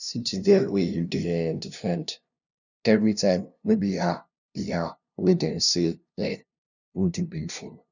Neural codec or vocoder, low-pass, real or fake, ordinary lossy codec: codec, 16 kHz, 0.5 kbps, FunCodec, trained on LibriTTS, 25 frames a second; 7.2 kHz; fake; none